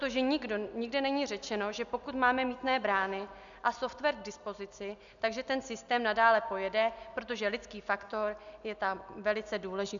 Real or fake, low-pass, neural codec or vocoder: real; 7.2 kHz; none